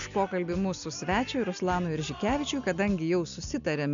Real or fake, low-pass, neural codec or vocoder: real; 7.2 kHz; none